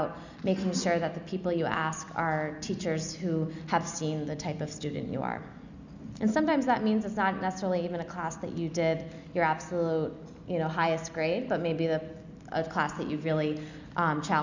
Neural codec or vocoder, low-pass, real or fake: none; 7.2 kHz; real